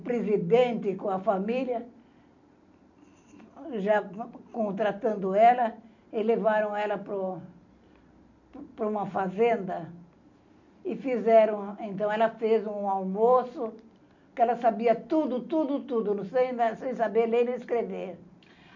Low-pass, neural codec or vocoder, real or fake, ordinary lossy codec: 7.2 kHz; none; real; none